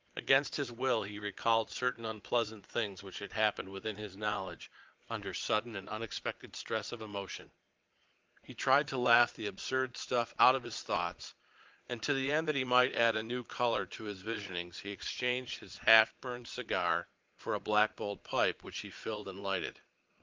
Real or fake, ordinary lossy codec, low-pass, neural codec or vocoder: fake; Opus, 32 kbps; 7.2 kHz; vocoder, 22.05 kHz, 80 mel bands, Vocos